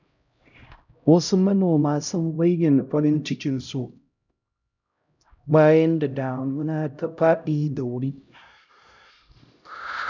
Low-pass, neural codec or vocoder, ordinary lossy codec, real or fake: 7.2 kHz; codec, 16 kHz, 0.5 kbps, X-Codec, HuBERT features, trained on LibriSpeech; none; fake